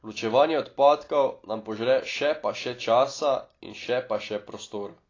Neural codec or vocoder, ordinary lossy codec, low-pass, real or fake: none; AAC, 32 kbps; 7.2 kHz; real